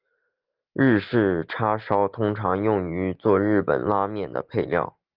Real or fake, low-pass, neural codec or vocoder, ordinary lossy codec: real; 5.4 kHz; none; Opus, 32 kbps